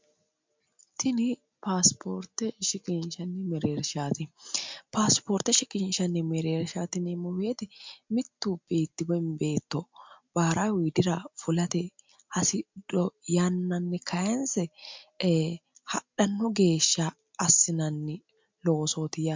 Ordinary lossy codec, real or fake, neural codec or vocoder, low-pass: MP3, 64 kbps; real; none; 7.2 kHz